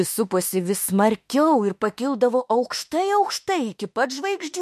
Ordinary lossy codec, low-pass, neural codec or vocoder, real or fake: MP3, 64 kbps; 14.4 kHz; autoencoder, 48 kHz, 32 numbers a frame, DAC-VAE, trained on Japanese speech; fake